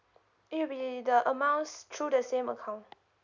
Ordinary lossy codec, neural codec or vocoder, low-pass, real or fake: none; none; 7.2 kHz; real